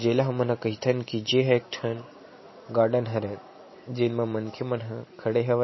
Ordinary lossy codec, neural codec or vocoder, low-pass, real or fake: MP3, 24 kbps; none; 7.2 kHz; real